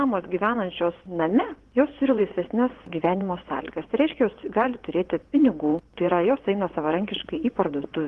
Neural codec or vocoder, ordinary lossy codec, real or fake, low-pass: vocoder, 24 kHz, 100 mel bands, Vocos; Opus, 16 kbps; fake; 10.8 kHz